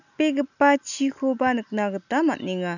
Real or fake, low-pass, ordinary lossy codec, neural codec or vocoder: real; 7.2 kHz; none; none